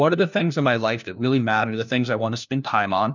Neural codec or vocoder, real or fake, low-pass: codec, 16 kHz, 1 kbps, FunCodec, trained on LibriTTS, 50 frames a second; fake; 7.2 kHz